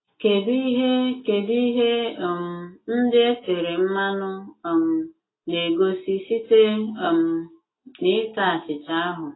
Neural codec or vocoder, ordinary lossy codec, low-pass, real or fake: none; AAC, 16 kbps; 7.2 kHz; real